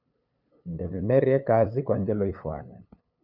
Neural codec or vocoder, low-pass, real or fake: codec, 16 kHz, 8 kbps, FunCodec, trained on LibriTTS, 25 frames a second; 5.4 kHz; fake